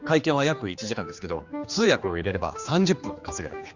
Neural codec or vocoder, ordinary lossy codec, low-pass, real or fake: codec, 16 kHz, 2 kbps, X-Codec, HuBERT features, trained on general audio; Opus, 64 kbps; 7.2 kHz; fake